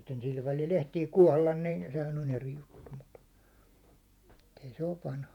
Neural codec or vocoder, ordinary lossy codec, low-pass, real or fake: vocoder, 48 kHz, 128 mel bands, Vocos; none; 19.8 kHz; fake